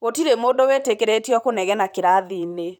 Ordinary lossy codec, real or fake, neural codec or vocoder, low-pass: none; fake; vocoder, 44.1 kHz, 128 mel bands, Pupu-Vocoder; 19.8 kHz